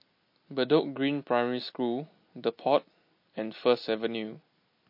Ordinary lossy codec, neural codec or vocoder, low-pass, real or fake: MP3, 32 kbps; none; 5.4 kHz; real